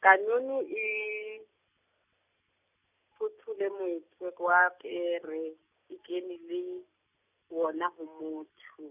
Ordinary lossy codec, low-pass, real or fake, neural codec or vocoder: none; 3.6 kHz; real; none